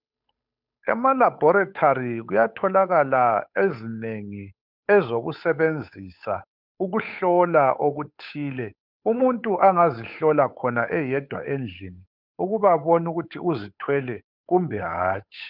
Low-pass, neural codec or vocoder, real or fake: 5.4 kHz; codec, 16 kHz, 8 kbps, FunCodec, trained on Chinese and English, 25 frames a second; fake